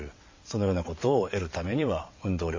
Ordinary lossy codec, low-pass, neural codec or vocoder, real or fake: MP3, 32 kbps; 7.2 kHz; vocoder, 22.05 kHz, 80 mel bands, WaveNeXt; fake